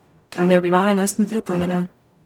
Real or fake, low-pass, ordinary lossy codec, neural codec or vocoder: fake; 19.8 kHz; none; codec, 44.1 kHz, 0.9 kbps, DAC